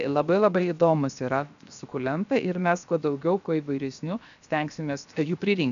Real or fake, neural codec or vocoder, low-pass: fake; codec, 16 kHz, 0.7 kbps, FocalCodec; 7.2 kHz